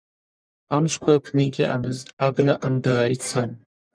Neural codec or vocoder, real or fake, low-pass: codec, 44.1 kHz, 1.7 kbps, Pupu-Codec; fake; 9.9 kHz